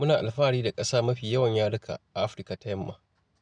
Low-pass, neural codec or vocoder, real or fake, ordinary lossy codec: 9.9 kHz; none; real; none